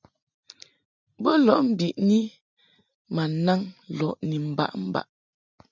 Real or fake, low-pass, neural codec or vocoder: real; 7.2 kHz; none